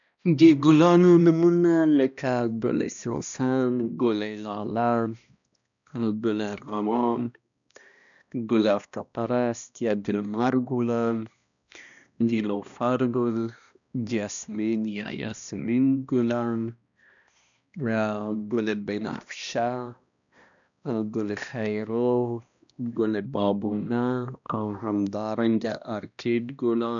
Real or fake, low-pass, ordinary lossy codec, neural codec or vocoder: fake; 7.2 kHz; none; codec, 16 kHz, 1 kbps, X-Codec, HuBERT features, trained on balanced general audio